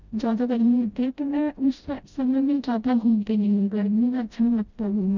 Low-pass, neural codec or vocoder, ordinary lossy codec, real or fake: 7.2 kHz; codec, 16 kHz, 0.5 kbps, FreqCodec, smaller model; none; fake